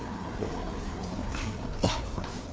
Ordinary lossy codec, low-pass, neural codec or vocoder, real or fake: none; none; codec, 16 kHz, 4 kbps, FreqCodec, larger model; fake